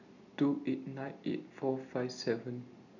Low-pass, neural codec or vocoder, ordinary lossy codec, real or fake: 7.2 kHz; none; none; real